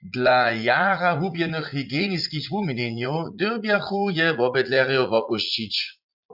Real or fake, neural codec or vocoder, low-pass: fake; vocoder, 44.1 kHz, 80 mel bands, Vocos; 5.4 kHz